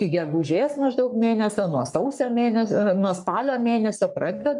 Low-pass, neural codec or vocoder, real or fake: 10.8 kHz; codec, 44.1 kHz, 3.4 kbps, Pupu-Codec; fake